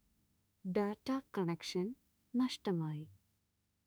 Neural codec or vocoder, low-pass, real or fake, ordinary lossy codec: autoencoder, 48 kHz, 32 numbers a frame, DAC-VAE, trained on Japanese speech; none; fake; none